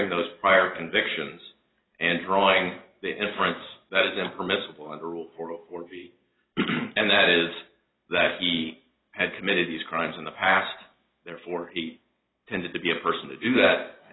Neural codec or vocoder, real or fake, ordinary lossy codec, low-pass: none; real; AAC, 16 kbps; 7.2 kHz